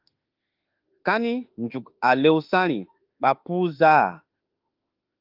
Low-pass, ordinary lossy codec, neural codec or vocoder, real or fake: 5.4 kHz; Opus, 32 kbps; autoencoder, 48 kHz, 32 numbers a frame, DAC-VAE, trained on Japanese speech; fake